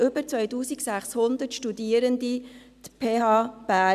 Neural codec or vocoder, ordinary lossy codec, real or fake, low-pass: none; none; real; 14.4 kHz